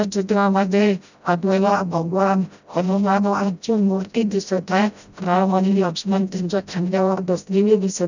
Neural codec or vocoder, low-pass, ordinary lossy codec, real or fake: codec, 16 kHz, 0.5 kbps, FreqCodec, smaller model; 7.2 kHz; none; fake